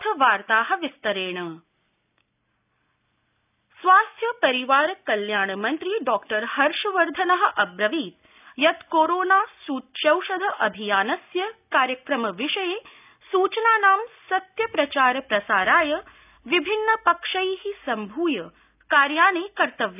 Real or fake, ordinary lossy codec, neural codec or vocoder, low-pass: real; none; none; 3.6 kHz